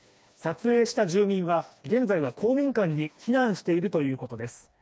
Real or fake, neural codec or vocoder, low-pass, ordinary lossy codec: fake; codec, 16 kHz, 2 kbps, FreqCodec, smaller model; none; none